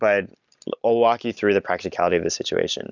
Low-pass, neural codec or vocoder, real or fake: 7.2 kHz; none; real